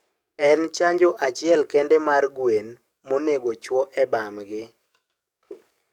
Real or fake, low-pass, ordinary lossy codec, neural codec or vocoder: fake; 19.8 kHz; none; codec, 44.1 kHz, 7.8 kbps, DAC